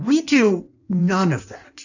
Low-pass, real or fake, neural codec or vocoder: 7.2 kHz; fake; codec, 16 kHz in and 24 kHz out, 1.1 kbps, FireRedTTS-2 codec